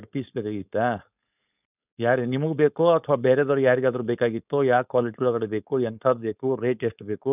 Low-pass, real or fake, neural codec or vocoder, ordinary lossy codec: 3.6 kHz; fake; codec, 16 kHz, 4.8 kbps, FACodec; none